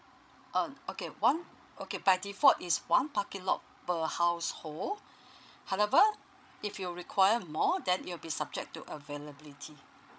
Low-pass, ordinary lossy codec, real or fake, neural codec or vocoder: none; none; fake; codec, 16 kHz, 16 kbps, FreqCodec, larger model